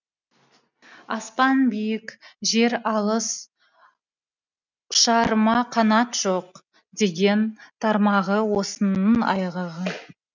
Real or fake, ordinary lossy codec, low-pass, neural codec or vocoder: real; none; 7.2 kHz; none